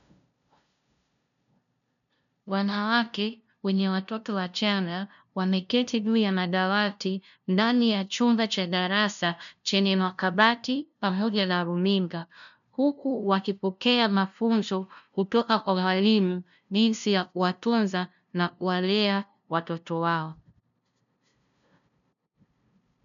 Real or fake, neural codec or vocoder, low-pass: fake; codec, 16 kHz, 0.5 kbps, FunCodec, trained on LibriTTS, 25 frames a second; 7.2 kHz